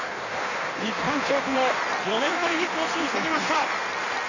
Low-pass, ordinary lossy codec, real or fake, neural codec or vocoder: 7.2 kHz; none; fake; codec, 16 kHz in and 24 kHz out, 1.1 kbps, FireRedTTS-2 codec